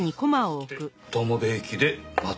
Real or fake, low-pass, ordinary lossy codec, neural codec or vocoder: real; none; none; none